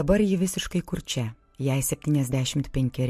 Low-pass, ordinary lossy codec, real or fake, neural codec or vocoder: 14.4 kHz; MP3, 64 kbps; real; none